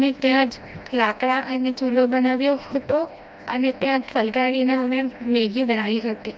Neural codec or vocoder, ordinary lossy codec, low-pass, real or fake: codec, 16 kHz, 1 kbps, FreqCodec, smaller model; none; none; fake